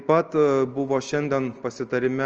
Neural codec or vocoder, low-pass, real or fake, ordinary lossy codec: none; 7.2 kHz; real; Opus, 24 kbps